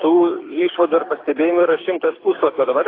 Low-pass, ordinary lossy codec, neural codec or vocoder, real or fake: 5.4 kHz; AAC, 24 kbps; codec, 24 kHz, 6 kbps, HILCodec; fake